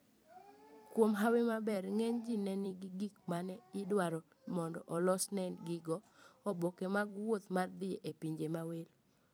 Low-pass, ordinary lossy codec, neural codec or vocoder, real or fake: none; none; vocoder, 44.1 kHz, 128 mel bands every 256 samples, BigVGAN v2; fake